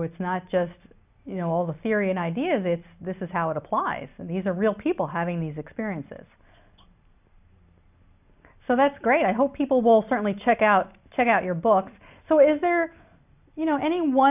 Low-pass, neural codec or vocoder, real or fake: 3.6 kHz; none; real